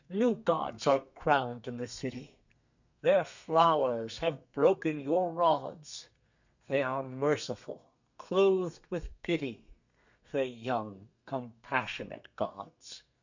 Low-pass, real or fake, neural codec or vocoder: 7.2 kHz; fake; codec, 32 kHz, 1.9 kbps, SNAC